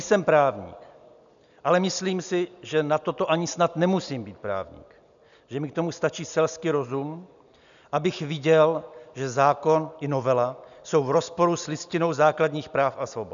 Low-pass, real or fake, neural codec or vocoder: 7.2 kHz; real; none